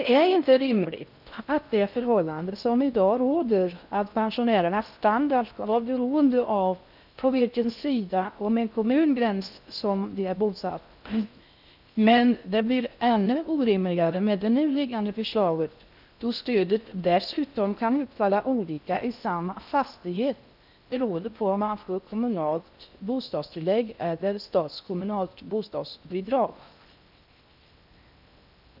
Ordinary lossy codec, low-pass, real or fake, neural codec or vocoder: none; 5.4 kHz; fake; codec, 16 kHz in and 24 kHz out, 0.6 kbps, FocalCodec, streaming, 4096 codes